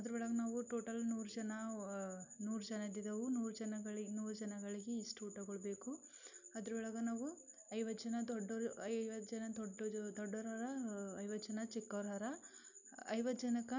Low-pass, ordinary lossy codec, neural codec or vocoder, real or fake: 7.2 kHz; none; none; real